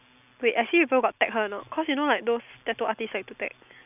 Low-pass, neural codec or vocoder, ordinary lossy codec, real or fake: 3.6 kHz; none; none; real